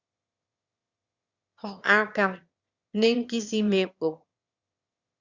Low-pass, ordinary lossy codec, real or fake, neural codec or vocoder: 7.2 kHz; Opus, 64 kbps; fake; autoencoder, 22.05 kHz, a latent of 192 numbers a frame, VITS, trained on one speaker